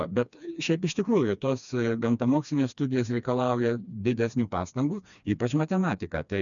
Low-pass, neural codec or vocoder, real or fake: 7.2 kHz; codec, 16 kHz, 2 kbps, FreqCodec, smaller model; fake